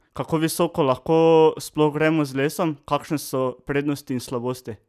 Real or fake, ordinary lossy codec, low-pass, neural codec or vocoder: real; none; 14.4 kHz; none